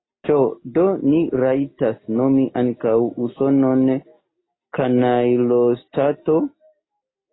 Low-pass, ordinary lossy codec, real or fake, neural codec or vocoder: 7.2 kHz; AAC, 16 kbps; real; none